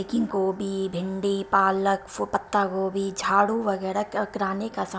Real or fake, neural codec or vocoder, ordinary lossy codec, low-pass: real; none; none; none